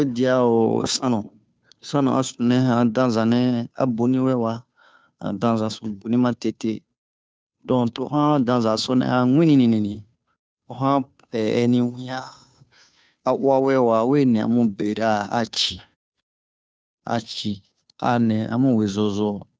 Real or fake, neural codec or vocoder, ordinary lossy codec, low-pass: fake; codec, 16 kHz, 2 kbps, FunCodec, trained on Chinese and English, 25 frames a second; none; none